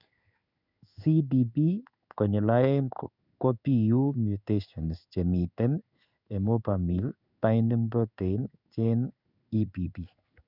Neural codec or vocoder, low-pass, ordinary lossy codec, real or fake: codec, 16 kHz in and 24 kHz out, 1 kbps, XY-Tokenizer; 5.4 kHz; none; fake